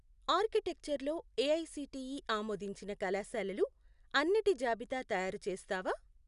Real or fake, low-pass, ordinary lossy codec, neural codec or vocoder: real; 10.8 kHz; none; none